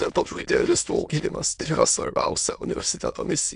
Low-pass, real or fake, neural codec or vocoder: 9.9 kHz; fake; autoencoder, 22.05 kHz, a latent of 192 numbers a frame, VITS, trained on many speakers